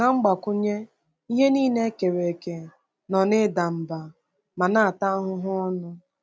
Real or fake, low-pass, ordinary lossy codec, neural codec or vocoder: real; none; none; none